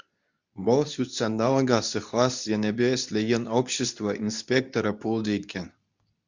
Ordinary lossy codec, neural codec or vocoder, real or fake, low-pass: Opus, 64 kbps; codec, 24 kHz, 0.9 kbps, WavTokenizer, medium speech release version 2; fake; 7.2 kHz